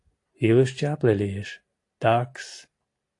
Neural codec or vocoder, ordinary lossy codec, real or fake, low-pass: none; AAC, 48 kbps; real; 10.8 kHz